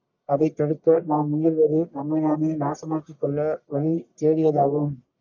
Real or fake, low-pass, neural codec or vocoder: fake; 7.2 kHz; codec, 44.1 kHz, 1.7 kbps, Pupu-Codec